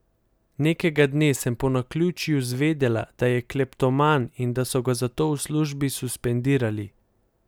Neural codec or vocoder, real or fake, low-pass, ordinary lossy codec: none; real; none; none